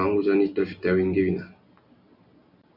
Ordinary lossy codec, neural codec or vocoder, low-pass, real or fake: Opus, 64 kbps; none; 5.4 kHz; real